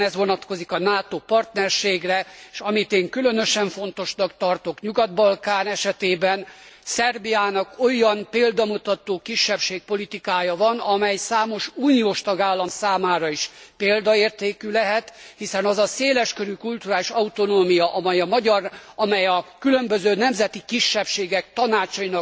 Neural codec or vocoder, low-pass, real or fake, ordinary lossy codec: none; none; real; none